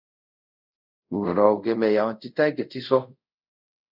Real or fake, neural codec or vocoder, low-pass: fake; codec, 24 kHz, 0.5 kbps, DualCodec; 5.4 kHz